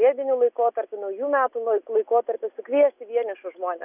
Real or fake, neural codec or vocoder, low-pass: real; none; 3.6 kHz